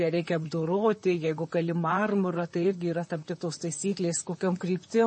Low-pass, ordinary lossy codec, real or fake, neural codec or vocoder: 10.8 kHz; MP3, 32 kbps; fake; vocoder, 44.1 kHz, 128 mel bands, Pupu-Vocoder